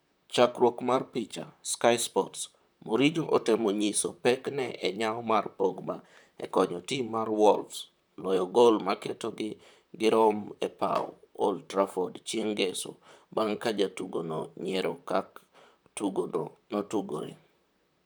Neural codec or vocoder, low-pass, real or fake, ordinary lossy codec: vocoder, 44.1 kHz, 128 mel bands, Pupu-Vocoder; none; fake; none